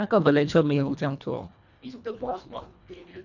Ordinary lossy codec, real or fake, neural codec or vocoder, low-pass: none; fake; codec, 24 kHz, 1.5 kbps, HILCodec; 7.2 kHz